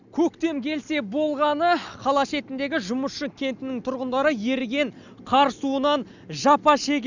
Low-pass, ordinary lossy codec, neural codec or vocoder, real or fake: 7.2 kHz; none; none; real